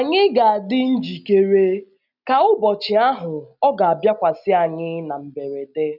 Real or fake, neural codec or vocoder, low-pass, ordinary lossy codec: real; none; 5.4 kHz; none